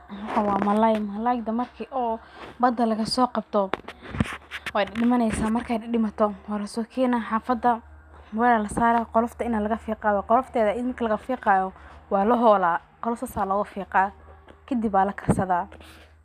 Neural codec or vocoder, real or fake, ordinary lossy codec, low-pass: none; real; none; 14.4 kHz